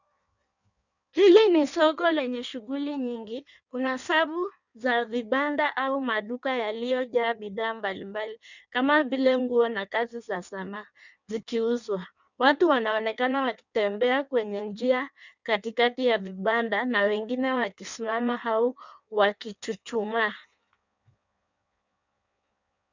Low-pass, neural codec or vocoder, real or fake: 7.2 kHz; codec, 16 kHz in and 24 kHz out, 1.1 kbps, FireRedTTS-2 codec; fake